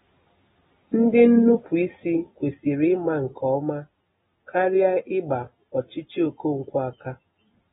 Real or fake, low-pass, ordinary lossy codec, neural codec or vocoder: real; 19.8 kHz; AAC, 16 kbps; none